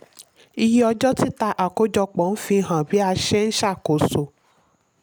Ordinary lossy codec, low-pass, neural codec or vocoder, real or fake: none; none; none; real